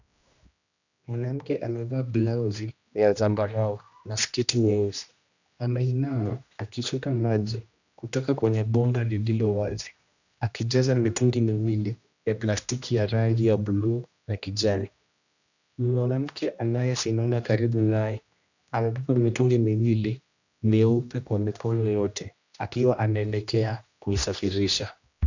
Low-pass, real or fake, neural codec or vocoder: 7.2 kHz; fake; codec, 16 kHz, 1 kbps, X-Codec, HuBERT features, trained on general audio